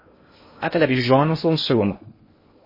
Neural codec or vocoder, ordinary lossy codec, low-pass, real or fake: codec, 16 kHz in and 24 kHz out, 0.6 kbps, FocalCodec, streaming, 2048 codes; MP3, 24 kbps; 5.4 kHz; fake